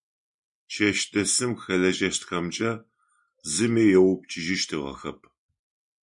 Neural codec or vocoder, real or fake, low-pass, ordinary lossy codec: none; real; 10.8 kHz; MP3, 64 kbps